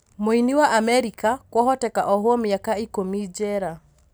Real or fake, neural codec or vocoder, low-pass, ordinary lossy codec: real; none; none; none